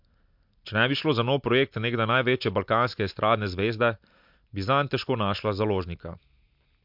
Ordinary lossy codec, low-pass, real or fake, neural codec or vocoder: MP3, 48 kbps; 5.4 kHz; real; none